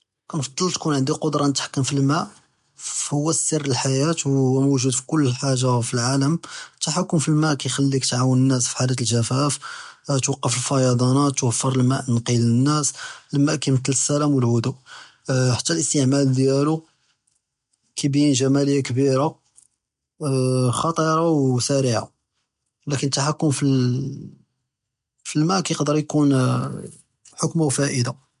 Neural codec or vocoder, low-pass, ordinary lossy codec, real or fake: none; 10.8 kHz; MP3, 64 kbps; real